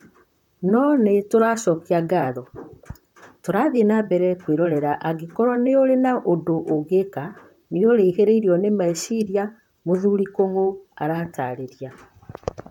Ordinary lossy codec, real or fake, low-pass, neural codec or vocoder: none; fake; 19.8 kHz; vocoder, 44.1 kHz, 128 mel bands, Pupu-Vocoder